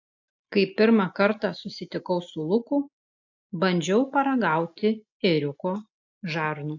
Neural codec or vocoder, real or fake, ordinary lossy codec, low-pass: none; real; AAC, 48 kbps; 7.2 kHz